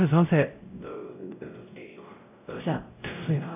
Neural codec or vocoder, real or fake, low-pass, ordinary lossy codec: codec, 16 kHz, 0.5 kbps, X-Codec, WavLM features, trained on Multilingual LibriSpeech; fake; 3.6 kHz; none